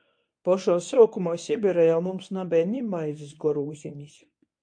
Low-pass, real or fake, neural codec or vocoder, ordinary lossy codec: 9.9 kHz; fake; codec, 24 kHz, 0.9 kbps, WavTokenizer, medium speech release version 1; AAC, 64 kbps